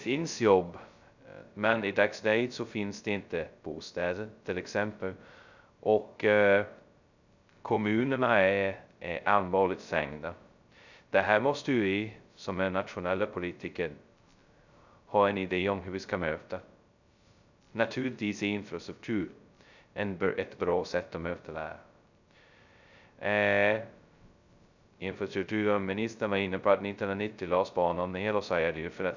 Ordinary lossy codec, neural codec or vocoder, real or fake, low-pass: none; codec, 16 kHz, 0.2 kbps, FocalCodec; fake; 7.2 kHz